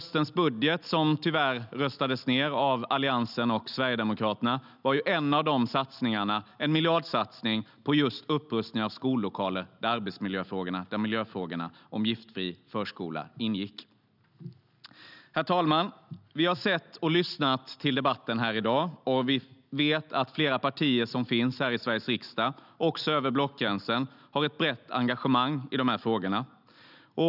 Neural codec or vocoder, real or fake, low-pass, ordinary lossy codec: none; real; 5.4 kHz; none